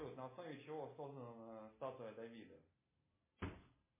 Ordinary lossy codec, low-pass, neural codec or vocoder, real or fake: MP3, 16 kbps; 3.6 kHz; none; real